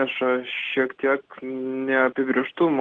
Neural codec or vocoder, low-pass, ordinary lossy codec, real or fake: none; 9.9 kHz; Opus, 16 kbps; real